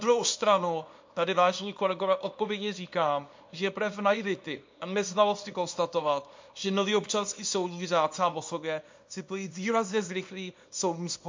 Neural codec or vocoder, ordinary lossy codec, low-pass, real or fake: codec, 24 kHz, 0.9 kbps, WavTokenizer, small release; MP3, 48 kbps; 7.2 kHz; fake